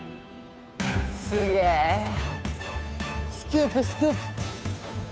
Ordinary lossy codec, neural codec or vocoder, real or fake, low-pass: none; codec, 16 kHz, 2 kbps, FunCodec, trained on Chinese and English, 25 frames a second; fake; none